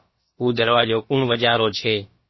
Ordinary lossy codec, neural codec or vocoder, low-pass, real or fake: MP3, 24 kbps; codec, 16 kHz, about 1 kbps, DyCAST, with the encoder's durations; 7.2 kHz; fake